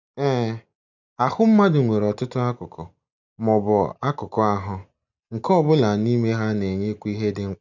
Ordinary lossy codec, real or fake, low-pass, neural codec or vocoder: none; real; 7.2 kHz; none